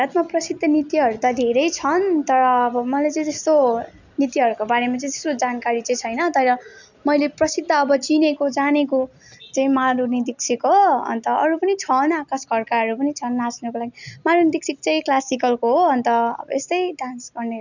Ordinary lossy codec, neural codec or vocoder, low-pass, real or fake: none; none; 7.2 kHz; real